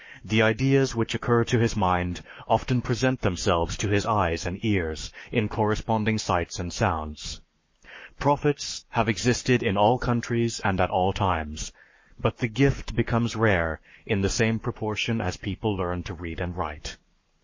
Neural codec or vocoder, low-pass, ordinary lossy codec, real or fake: codec, 44.1 kHz, 7.8 kbps, Pupu-Codec; 7.2 kHz; MP3, 32 kbps; fake